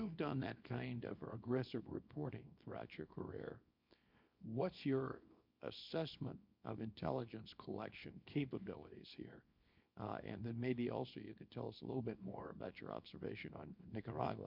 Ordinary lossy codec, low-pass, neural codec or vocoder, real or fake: MP3, 48 kbps; 5.4 kHz; codec, 24 kHz, 0.9 kbps, WavTokenizer, small release; fake